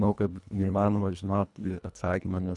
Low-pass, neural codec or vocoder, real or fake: 10.8 kHz; codec, 24 kHz, 1.5 kbps, HILCodec; fake